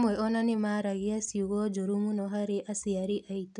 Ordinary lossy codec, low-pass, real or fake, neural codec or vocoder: none; 9.9 kHz; real; none